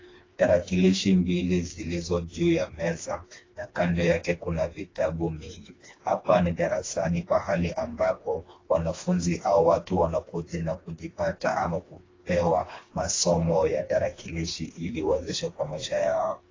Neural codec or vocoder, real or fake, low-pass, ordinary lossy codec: codec, 16 kHz, 2 kbps, FreqCodec, smaller model; fake; 7.2 kHz; AAC, 32 kbps